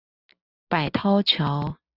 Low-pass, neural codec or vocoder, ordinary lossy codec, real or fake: 5.4 kHz; none; Opus, 64 kbps; real